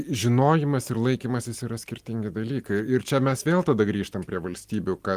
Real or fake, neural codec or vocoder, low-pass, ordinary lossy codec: real; none; 14.4 kHz; Opus, 24 kbps